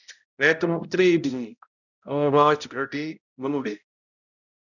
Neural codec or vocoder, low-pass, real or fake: codec, 16 kHz, 0.5 kbps, X-Codec, HuBERT features, trained on balanced general audio; 7.2 kHz; fake